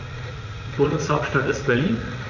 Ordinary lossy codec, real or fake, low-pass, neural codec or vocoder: none; fake; 7.2 kHz; vocoder, 44.1 kHz, 80 mel bands, Vocos